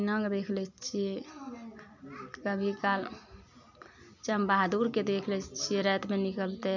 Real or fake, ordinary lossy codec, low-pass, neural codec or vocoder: real; none; 7.2 kHz; none